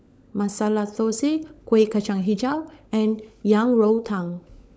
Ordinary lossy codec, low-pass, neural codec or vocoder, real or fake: none; none; codec, 16 kHz, 16 kbps, FunCodec, trained on LibriTTS, 50 frames a second; fake